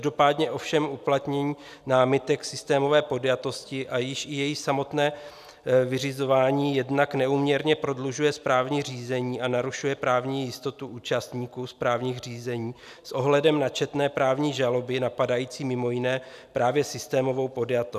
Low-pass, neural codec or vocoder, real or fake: 14.4 kHz; none; real